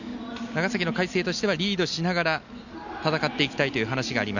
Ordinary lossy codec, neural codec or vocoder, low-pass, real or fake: none; none; 7.2 kHz; real